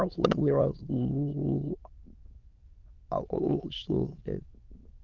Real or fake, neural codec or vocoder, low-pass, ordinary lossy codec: fake; autoencoder, 22.05 kHz, a latent of 192 numbers a frame, VITS, trained on many speakers; 7.2 kHz; Opus, 32 kbps